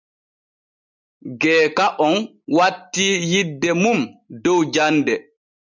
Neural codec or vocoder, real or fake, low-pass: none; real; 7.2 kHz